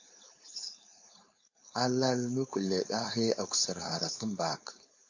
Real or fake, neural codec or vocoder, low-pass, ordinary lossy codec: fake; codec, 16 kHz, 4.8 kbps, FACodec; 7.2 kHz; AAC, 48 kbps